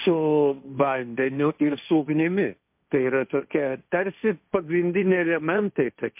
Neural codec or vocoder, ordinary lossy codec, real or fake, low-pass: codec, 16 kHz, 1.1 kbps, Voila-Tokenizer; MP3, 32 kbps; fake; 3.6 kHz